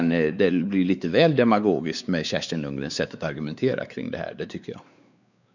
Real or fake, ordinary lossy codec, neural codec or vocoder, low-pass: fake; none; codec, 16 kHz, 4 kbps, X-Codec, WavLM features, trained on Multilingual LibriSpeech; 7.2 kHz